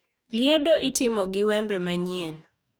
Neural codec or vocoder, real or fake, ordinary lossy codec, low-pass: codec, 44.1 kHz, 2.6 kbps, DAC; fake; none; none